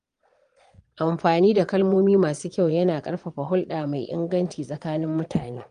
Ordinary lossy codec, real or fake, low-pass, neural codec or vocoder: Opus, 32 kbps; fake; 9.9 kHz; vocoder, 22.05 kHz, 80 mel bands, Vocos